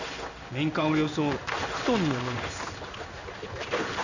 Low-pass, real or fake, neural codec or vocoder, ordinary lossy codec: 7.2 kHz; fake; codec, 16 kHz in and 24 kHz out, 1 kbps, XY-Tokenizer; none